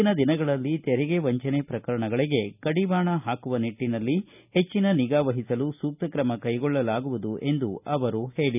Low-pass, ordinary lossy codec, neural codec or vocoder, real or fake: 3.6 kHz; none; none; real